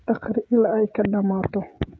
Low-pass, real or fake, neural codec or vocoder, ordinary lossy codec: none; fake; codec, 16 kHz, 16 kbps, FreqCodec, smaller model; none